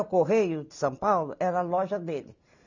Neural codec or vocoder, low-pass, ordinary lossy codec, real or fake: none; 7.2 kHz; none; real